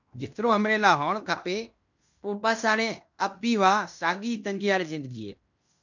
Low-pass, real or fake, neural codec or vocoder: 7.2 kHz; fake; codec, 16 kHz in and 24 kHz out, 0.9 kbps, LongCat-Audio-Codec, fine tuned four codebook decoder